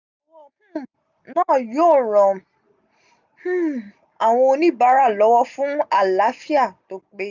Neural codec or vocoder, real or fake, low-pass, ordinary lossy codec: none; real; 7.2 kHz; none